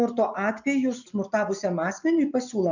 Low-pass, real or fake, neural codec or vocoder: 7.2 kHz; real; none